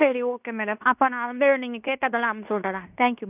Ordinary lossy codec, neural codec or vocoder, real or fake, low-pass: none; codec, 16 kHz in and 24 kHz out, 0.9 kbps, LongCat-Audio-Codec, fine tuned four codebook decoder; fake; 3.6 kHz